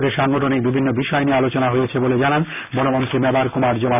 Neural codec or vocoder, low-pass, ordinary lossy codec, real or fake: none; 3.6 kHz; none; real